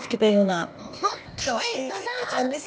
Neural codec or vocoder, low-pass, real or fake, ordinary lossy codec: codec, 16 kHz, 0.8 kbps, ZipCodec; none; fake; none